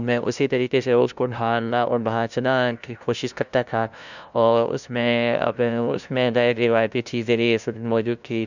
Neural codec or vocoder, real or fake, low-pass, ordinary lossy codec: codec, 16 kHz, 0.5 kbps, FunCodec, trained on LibriTTS, 25 frames a second; fake; 7.2 kHz; none